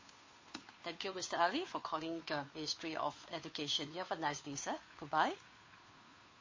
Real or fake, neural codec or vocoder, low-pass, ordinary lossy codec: fake; codec, 16 kHz, 2 kbps, FunCodec, trained on Chinese and English, 25 frames a second; 7.2 kHz; MP3, 32 kbps